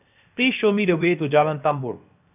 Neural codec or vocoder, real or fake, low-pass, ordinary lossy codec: codec, 16 kHz, 0.7 kbps, FocalCodec; fake; 3.6 kHz; none